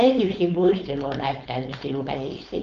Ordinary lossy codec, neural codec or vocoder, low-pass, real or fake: Opus, 24 kbps; codec, 16 kHz, 4.8 kbps, FACodec; 7.2 kHz; fake